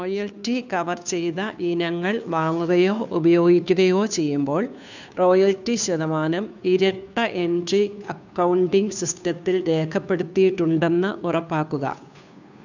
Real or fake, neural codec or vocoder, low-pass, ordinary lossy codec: fake; codec, 16 kHz, 2 kbps, FunCodec, trained on Chinese and English, 25 frames a second; 7.2 kHz; none